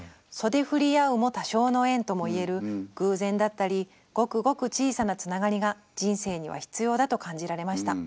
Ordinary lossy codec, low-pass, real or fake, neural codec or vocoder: none; none; real; none